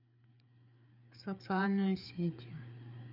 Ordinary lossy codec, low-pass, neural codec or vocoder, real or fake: none; 5.4 kHz; codec, 16 kHz, 4 kbps, FreqCodec, larger model; fake